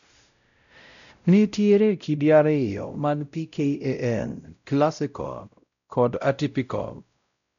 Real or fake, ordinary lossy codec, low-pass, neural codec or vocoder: fake; none; 7.2 kHz; codec, 16 kHz, 0.5 kbps, X-Codec, WavLM features, trained on Multilingual LibriSpeech